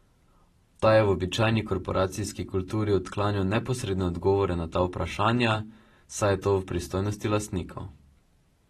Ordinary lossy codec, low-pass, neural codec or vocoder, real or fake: AAC, 32 kbps; 19.8 kHz; none; real